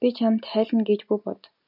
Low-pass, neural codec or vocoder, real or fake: 5.4 kHz; none; real